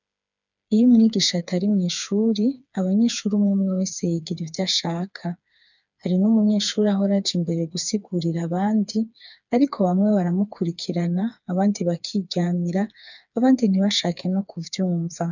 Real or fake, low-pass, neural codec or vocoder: fake; 7.2 kHz; codec, 16 kHz, 4 kbps, FreqCodec, smaller model